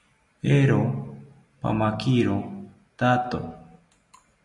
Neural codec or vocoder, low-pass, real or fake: none; 10.8 kHz; real